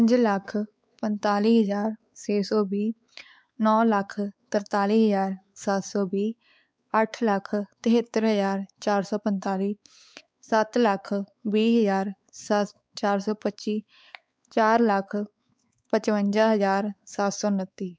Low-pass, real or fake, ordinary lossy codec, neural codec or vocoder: none; fake; none; codec, 16 kHz, 4 kbps, X-Codec, WavLM features, trained on Multilingual LibriSpeech